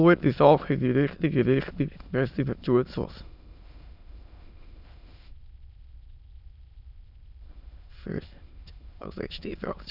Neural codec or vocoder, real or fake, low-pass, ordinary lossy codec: autoencoder, 22.05 kHz, a latent of 192 numbers a frame, VITS, trained on many speakers; fake; 5.4 kHz; none